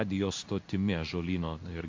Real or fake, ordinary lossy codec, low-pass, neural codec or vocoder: fake; MP3, 48 kbps; 7.2 kHz; codec, 16 kHz in and 24 kHz out, 1 kbps, XY-Tokenizer